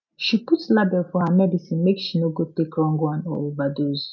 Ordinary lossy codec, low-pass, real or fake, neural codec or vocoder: none; 7.2 kHz; real; none